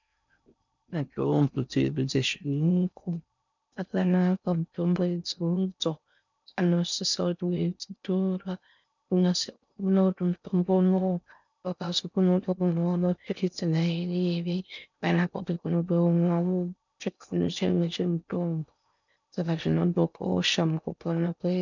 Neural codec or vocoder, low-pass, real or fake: codec, 16 kHz in and 24 kHz out, 0.6 kbps, FocalCodec, streaming, 4096 codes; 7.2 kHz; fake